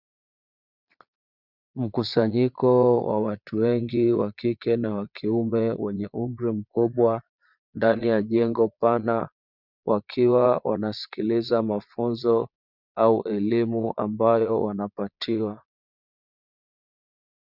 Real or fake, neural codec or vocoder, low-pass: fake; vocoder, 22.05 kHz, 80 mel bands, Vocos; 5.4 kHz